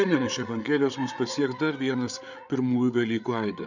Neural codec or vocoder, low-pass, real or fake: codec, 16 kHz, 8 kbps, FreqCodec, larger model; 7.2 kHz; fake